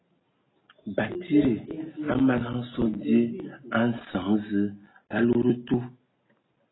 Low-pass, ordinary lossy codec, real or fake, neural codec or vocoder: 7.2 kHz; AAC, 16 kbps; real; none